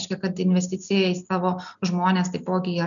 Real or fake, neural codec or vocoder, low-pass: real; none; 7.2 kHz